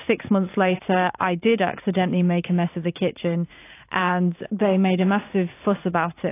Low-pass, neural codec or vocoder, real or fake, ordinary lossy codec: 3.6 kHz; vocoder, 44.1 kHz, 128 mel bands every 512 samples, BigVGAN v2; fake; AAC, 24 kbps